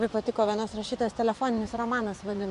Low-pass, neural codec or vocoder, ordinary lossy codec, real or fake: 10.8 kHz; vocoder, 24 kHz, 100 mel bands, Vocos; AAC, 64 kbps; fake